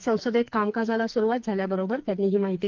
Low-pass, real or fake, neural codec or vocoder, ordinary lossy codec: 7.2 kHz; fake; codec, 44.1 kHz, 2.6 kbps, SNAC; Opus, 32 kbps